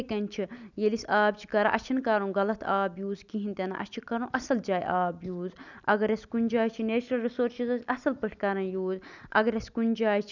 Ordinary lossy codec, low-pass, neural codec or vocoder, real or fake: none; 7.2 kHz; none; real